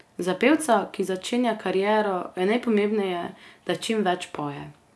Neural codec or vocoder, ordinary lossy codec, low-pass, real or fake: none; none; none; real